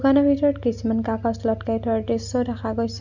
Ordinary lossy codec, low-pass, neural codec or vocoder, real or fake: none; 7.2 kHz; none; real